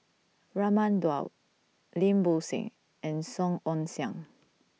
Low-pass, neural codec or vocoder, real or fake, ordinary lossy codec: none; none; real; none